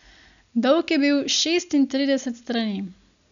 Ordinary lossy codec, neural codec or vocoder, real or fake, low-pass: none; none; real; 7.2 kHz